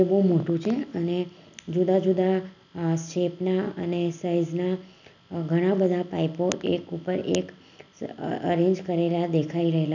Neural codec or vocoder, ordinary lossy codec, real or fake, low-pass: none; none; real; 7.2 kHz